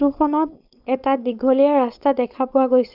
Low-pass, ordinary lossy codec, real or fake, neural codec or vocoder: 5.4 kHz; none; fake; codec, 16 kHz, 4.8 kbps, FACodec